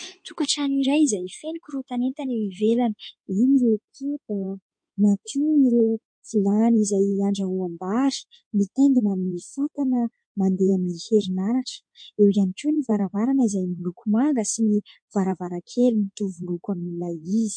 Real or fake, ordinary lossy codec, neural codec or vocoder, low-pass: fake; MP3, 48 kbps; codec, 16 kHz in and 24 kHz out, 2.2 kbps, FireRedTTS-2 codec; 9.9 kHz